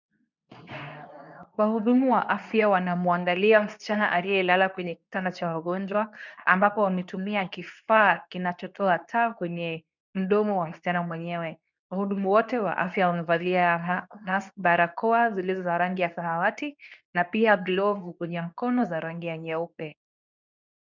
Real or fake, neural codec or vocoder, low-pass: fake; codec, 24 kHz, 0.9 kbps, WavTokenizer, medium speech release version 2; 7.2 kHz